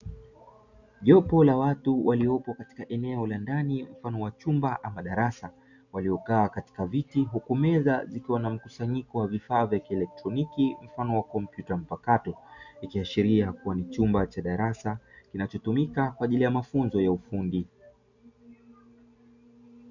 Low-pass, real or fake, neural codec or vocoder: 7.2 kHz; real; none